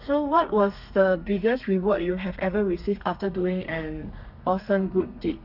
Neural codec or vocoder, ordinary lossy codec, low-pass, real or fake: codec, 32 kHz, 1.9 kbps, SNAC; none; 5.4 kHz; fake